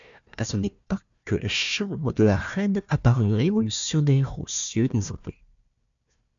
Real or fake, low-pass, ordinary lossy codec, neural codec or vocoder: fake; 7.2 kHz; MP3, 64 kbps; codec, 16 kHz, 1 kbps, FunCodec, trained on Chinese and English, 50 frames a second